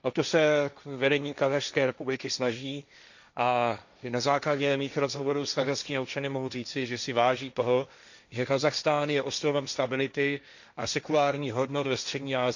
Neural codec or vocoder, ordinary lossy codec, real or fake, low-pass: codec, 16 kHz, 1.1 kbps, Voila-Tokenizer; none; fake; 7.2 kHz